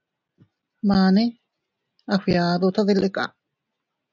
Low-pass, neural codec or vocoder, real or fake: 7.2 kHz; none; real